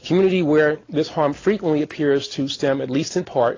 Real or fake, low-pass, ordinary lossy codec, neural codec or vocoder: real; 7.2 kHz; AAC, 32 kbps; none